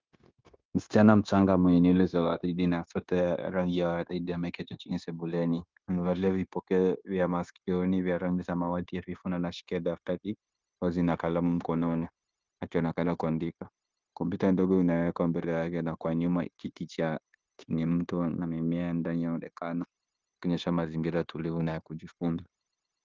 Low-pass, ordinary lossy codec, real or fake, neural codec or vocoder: 7.2 kHz; Opus, 32 kbps; fake; codec, 16 kHz, 0.9 kbps, LongCat-Audio-Codec